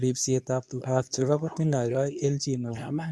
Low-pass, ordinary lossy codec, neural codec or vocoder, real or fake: none; none; codec, 24 kHz, 0.9 kbps, WavTokenizer, medium speech release version 2; fake